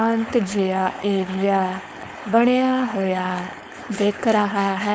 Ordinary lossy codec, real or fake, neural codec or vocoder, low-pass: none; fake; codec, 16 kHz, 4.8 kbps, FACodec; none